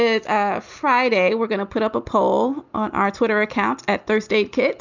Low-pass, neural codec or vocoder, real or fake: 7.2 kHz; none; real